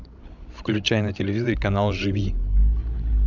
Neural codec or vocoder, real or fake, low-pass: codec, 16 kHz, 16 kbps, FreqCodec, larger model; fake; 7.2 kHz